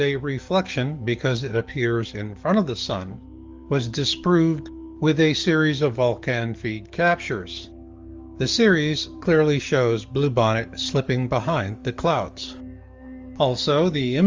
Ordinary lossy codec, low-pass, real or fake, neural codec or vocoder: Opus, 32 kbps; 7.2 kHz; fake; codec, 44.1 kHz, 7.8 kbps, DAC